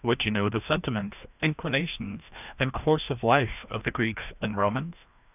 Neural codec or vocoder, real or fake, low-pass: codec, 16 kHz, 1 kbps, FreqCodec, larger model; fake; 3.6 kHz